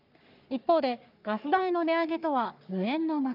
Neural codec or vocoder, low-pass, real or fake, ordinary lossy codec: codec, 44.1 kHz, 3.4 kbps, Pupu-Codec; 5.4 kHz; fake; none